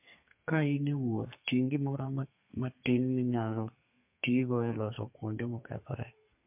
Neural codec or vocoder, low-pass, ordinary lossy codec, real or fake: codec, 32 kHz, 1.9 kbps, SNAC; 3.6 kHz; MP3, 32 kbps; fake